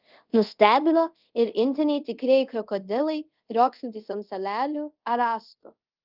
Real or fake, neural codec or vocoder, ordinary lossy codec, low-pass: fake; codec, 24 kHz, 0.5 kbps, DualCodec; Opus, 32 kbps; 5.4 kHz